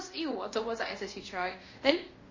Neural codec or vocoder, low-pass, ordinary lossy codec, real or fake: codec, 24 kHz, 0.5 kbps, DualCodec; 7.2 kHz; MP3, 32 kbps; fake